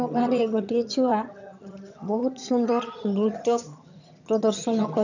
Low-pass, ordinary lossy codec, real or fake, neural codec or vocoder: 7.2 kHz; none; fake; vocoder, 22.05 kHz, 80 mel bands, HiFi-GAN